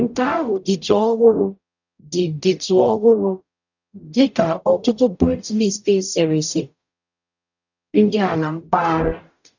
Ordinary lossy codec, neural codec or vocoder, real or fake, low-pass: none; codec, 44.1 kHz, 0.9 kbps, DAC; fake; 7.2 kHz